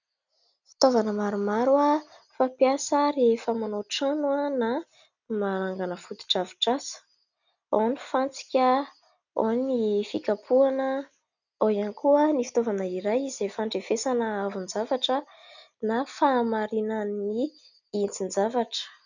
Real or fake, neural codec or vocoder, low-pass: real; none; 7.2 kHz